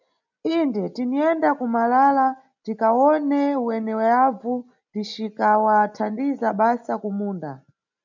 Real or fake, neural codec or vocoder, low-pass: real; none; 7.2 kHz